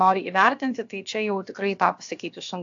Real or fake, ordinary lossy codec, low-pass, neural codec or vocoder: fake; AAC, 48 kbps; 7.2 kHz; codec, 16 kHz, about 1 kbps, DyCAST, with the encoder's durations